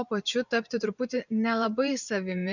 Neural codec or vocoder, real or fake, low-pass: vocoder, 44.1 kHz, 128 mel bands every 512 samples, BigVGAN v2; fake; 7.2 kHz